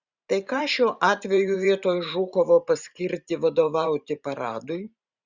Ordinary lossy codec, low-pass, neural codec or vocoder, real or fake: Opus, 64 kbps; 7.2 kHz; vocoder, 44.1 kHz, 128 mel bands every 512 samples, BigVGAN v2; fake